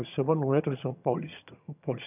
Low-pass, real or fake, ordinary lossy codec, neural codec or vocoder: 3.6 kHz; fake; none; vocoder, 22.05 kHz, 80 mel bands, HiFi-GAN